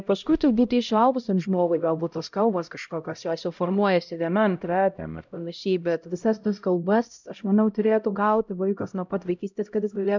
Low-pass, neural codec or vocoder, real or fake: 7.2 kHz; codec, 16 kHz, 0.5 kbps, X-Codec, HuBERT features, trained on LibriSpeech; fake